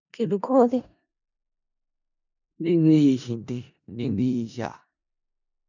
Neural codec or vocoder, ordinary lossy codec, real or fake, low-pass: codec, 16 kHz in and 24 kHz out, 0.4 kbps, LongCat-Audio-Codec, four codebook decoder; none; fake; 7.2 kHz